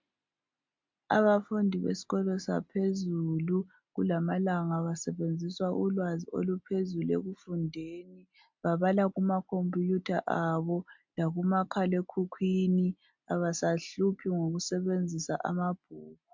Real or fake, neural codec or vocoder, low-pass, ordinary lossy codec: real; none; 7.2 kHz; MP3, 64 kbps